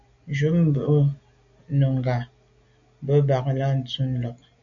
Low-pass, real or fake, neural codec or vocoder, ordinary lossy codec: 7.2 kHz; real; none; MP3, 64 kbps